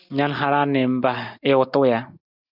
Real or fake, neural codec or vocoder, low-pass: real; none; 5.4 kHz